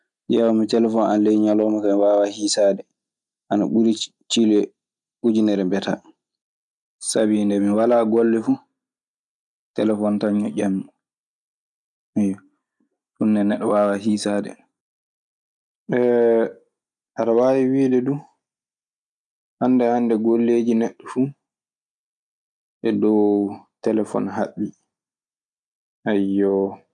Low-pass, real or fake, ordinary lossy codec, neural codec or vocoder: 10.8 kHz; real; none; none